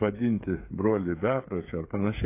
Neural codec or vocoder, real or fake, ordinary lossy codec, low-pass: codec, 16 kHz, 8 kbps, FreqCodec, smaller model; fake; AAC, 24 kbps; 3.6 kHz